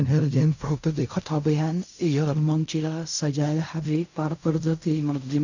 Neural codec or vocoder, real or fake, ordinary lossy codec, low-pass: codec, 16 kHz in and 24 kHz out, 0.4 kbps, LongCat-Audio-Codec, fine tuned four codebook decoder; fake; none; 7.2 kHz